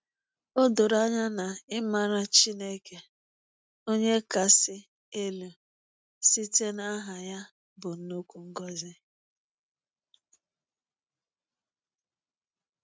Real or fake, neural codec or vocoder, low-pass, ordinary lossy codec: real; none; none; none